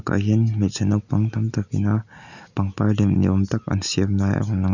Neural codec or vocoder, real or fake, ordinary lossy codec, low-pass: none; real; none; 7.2 kHz